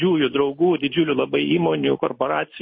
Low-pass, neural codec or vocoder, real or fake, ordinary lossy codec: 7.2 kHz; none; real; MP3, 24 kbps